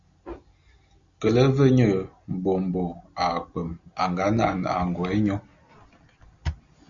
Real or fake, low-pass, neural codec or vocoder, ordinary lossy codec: real; 7.2 kHz; none; Opus, 64 kbps